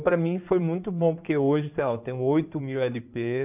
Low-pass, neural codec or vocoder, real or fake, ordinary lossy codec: 3.6 kHz; codec, 16 kHz in and 24 kHz out, 2.2 kbps, FireRedTTS-2 codec; fake; none